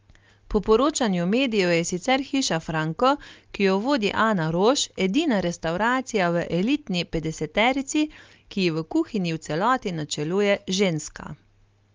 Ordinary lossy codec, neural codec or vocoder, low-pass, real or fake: Opus, 32 kbps; none; 7.2 kHz; real